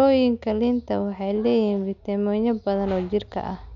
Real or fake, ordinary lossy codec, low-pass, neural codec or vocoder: real; none; 7.2 kHz; none